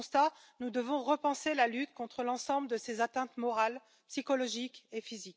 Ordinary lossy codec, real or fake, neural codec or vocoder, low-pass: none; real; none; none